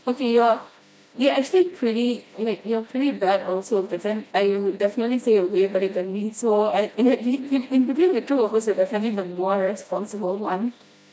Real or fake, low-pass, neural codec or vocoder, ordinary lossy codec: fake; none; codec, 16 kHz, 1 kbps, FreqCodec, smaller model; none